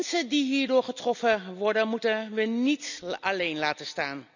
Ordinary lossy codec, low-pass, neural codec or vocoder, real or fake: none; 7.2 kHz; none; real